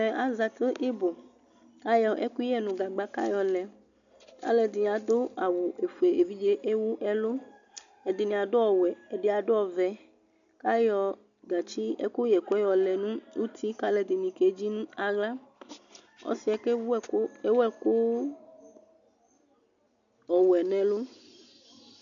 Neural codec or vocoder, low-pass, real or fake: none; 7.2 kHz; real